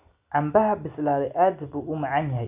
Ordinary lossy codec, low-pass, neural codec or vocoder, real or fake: none; 3.6 kHz; none; real